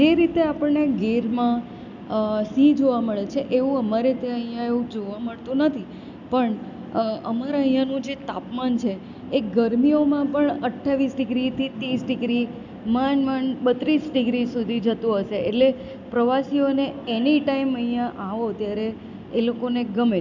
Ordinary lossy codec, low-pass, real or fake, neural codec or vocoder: none; 7.2 kHz; real; none